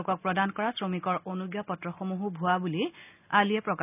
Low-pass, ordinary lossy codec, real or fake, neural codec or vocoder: 3.6 kHz; none; real; none